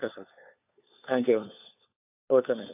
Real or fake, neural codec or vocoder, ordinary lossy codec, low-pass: fake; codec, 16 kHz, 4 kbps, FunCodec, trained on LibriTTS, 50 frames a second; AAC, 32 kbps; 3.6 kHz